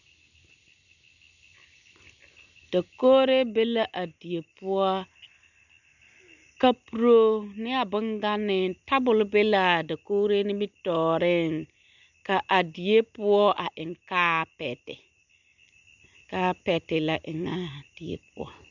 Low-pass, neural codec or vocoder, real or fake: 7.2 kHz; none; real